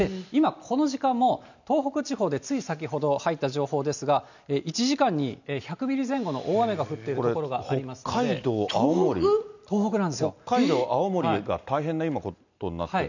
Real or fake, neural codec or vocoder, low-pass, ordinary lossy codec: real; none; 7.2 kHz; none